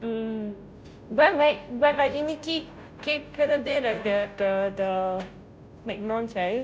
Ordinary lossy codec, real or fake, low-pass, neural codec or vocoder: none; fake; none; codec, 16 kHz, 0.5 kbps, FunCodec, trained on Chinese and English, 25 frames a second